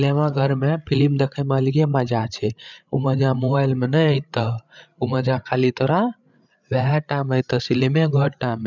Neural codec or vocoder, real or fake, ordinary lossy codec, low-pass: codec, 16 kHz, 8 kbps, FreqCodec, larger model; fake; none; 7.2 kHz